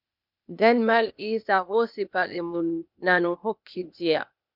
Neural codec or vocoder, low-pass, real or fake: codec, 16 kHz, 0.8 kbps, ZipCodec; 5.4 kHz; fake